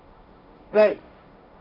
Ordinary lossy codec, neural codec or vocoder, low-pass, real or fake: none; codec, 16 kHz, 1.1 kbps, Voila-Tokenizer; 5.4 kHz; fake